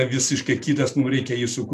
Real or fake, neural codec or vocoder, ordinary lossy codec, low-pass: real; none; MP3, 96 kbps; 14.4 kHz